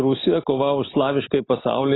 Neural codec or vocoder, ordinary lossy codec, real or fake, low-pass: none; AAC, 16 kbps; real; 7.2 kHz